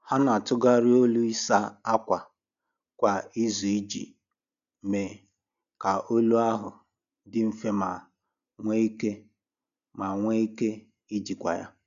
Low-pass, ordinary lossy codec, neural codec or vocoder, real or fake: 7.2 kHz; none; none; real